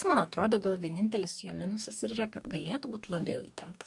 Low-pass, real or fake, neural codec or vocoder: 10.8 kHz; fake; codec, 44.1 kHz, 2.6 kbps, DAC